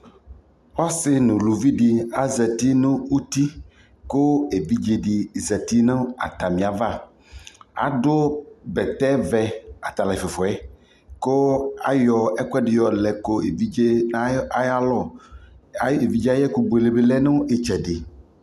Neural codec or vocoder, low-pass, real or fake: none; 14.4 kHz; real